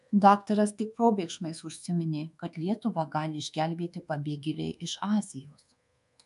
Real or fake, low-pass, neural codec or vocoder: fake; 10.8 kHz; codec, 24 kHz, 1.2 kbps, DualCodec